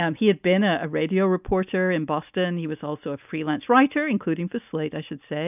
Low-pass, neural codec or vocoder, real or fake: 3.6 kHz; none; real